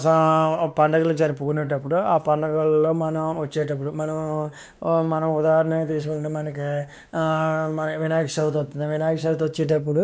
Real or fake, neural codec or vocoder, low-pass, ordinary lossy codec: fake; codec, 16 kHz, 2 kbps, X-Codec, WavLM features, trained on Multilingual LibriSpeech; none; none